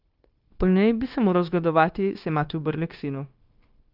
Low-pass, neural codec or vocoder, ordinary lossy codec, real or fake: 5.4 kHz; codec, 16 kHz, 0.9 kbps, LongCat-Audio-Codec; Opus, 32 kbps; fake